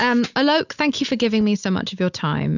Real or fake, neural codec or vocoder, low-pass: real; none; 7.2 kHz